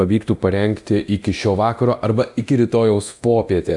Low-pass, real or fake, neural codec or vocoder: 10.8 kHz; fake; codec, 24 kHz, 0.9 kbps, DualCodec